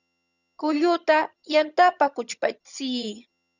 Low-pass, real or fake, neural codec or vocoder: 7.2 kHz; fake; vocoder, 22.05 kHz, 80 mel bands, HiFi-GAN